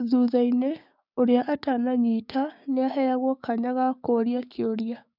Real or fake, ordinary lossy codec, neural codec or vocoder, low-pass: fake; none; codec, 16 kHz, 6 kbps, DAC; 5.4 kHz